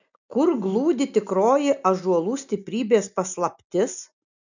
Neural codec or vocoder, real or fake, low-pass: none; real; 7.2 kHz